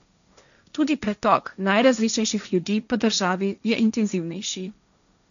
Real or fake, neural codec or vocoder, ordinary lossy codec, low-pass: fake; codec, 16 kHz, 1.1 kbps, Voila-Tokenizer; none; 7.2 kHz